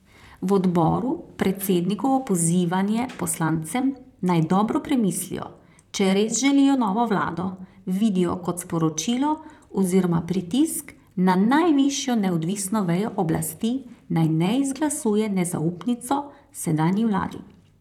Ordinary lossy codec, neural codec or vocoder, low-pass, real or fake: none; vocoder, 44.1 kHz, 128 mel bands, Pupu-Vocoder; 19.8 kHz; fake